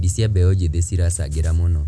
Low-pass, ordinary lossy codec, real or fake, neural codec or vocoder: none; none; real; none